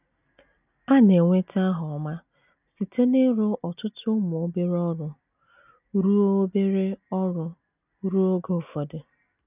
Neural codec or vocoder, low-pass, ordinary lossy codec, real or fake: none; 3.6 kHz; none; real